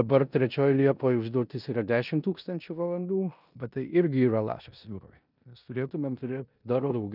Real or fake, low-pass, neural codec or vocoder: fake; 5.4 kHz; codec, 16 kHz in and 24 kHz out, 0.9 kbps, LongCat-Audio-Codec, four codebook decoder